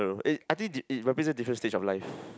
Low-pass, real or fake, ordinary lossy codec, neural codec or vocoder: none; real; none; none